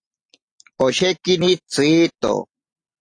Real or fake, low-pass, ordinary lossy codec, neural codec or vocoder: real; 9.9 kHz; AAC, 48 kbps; none